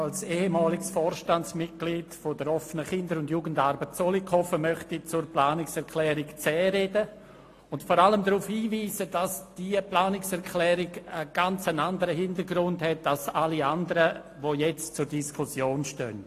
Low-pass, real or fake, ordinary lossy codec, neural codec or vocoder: 14.4 kHz; real; AAC, 48 kbps; none